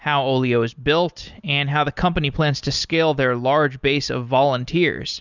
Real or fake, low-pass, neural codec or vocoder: real; 7.2 kHz; none